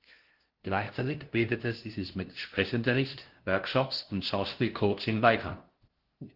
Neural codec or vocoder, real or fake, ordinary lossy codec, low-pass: codec, 16 kHz, 0.5 kbps, FunCodec, trained on LibriTTS, 25 frames a second; fake; Opus, 16 kbps; 5.4 kHz